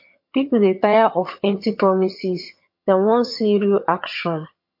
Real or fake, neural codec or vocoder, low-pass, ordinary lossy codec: fake; vocoder, 22.05 kHz, 80 mel bands, HiFi-GAN; 5.4 kHz; MP3, 32 kbps